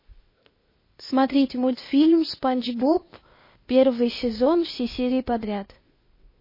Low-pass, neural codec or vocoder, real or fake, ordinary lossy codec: 5.4 kHz; codec, 16 kHz, 0.8 kbps, ZipCodec; fake; MP3, 24 kbps